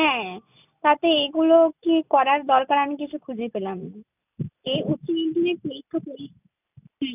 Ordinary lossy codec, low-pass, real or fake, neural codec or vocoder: none; 3.6 kHz; real; none